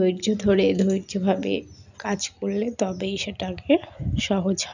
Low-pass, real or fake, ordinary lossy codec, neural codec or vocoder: 7.2 kHz; real; none; none